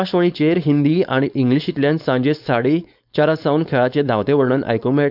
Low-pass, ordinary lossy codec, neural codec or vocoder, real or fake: 5.4 kHz; none; codec, 16 kHz, 4.8 kbps, FACodec; fake